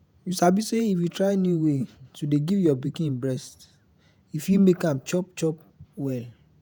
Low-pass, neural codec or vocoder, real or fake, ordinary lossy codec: none; vocoder, 48 kHz, 128 mel bands, Vocos; fake; none